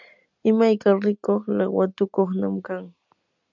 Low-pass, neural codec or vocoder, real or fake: 7.2 kHz; none; real